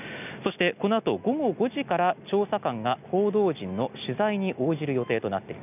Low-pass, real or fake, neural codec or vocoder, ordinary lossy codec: 3.6 kHz; real; none; none